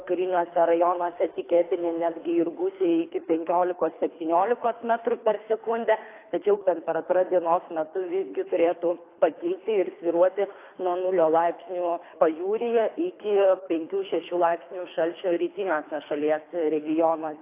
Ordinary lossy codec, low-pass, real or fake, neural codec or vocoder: AAC, 24 kbps; 3.6 kHz; fake; codec, 24 kHz, 3 kbps, HILCodec